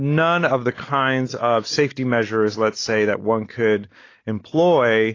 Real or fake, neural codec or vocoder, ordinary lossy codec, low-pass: real; none; AAC, 32 kbps; 7.2 kHz